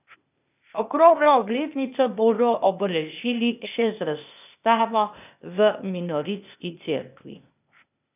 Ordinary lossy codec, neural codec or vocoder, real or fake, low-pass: none; codec, 16 kHz, 0.8 kbps, ZipCodec; fake; 3.6 kHz